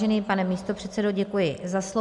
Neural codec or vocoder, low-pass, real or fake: none; 10.8 kHz; real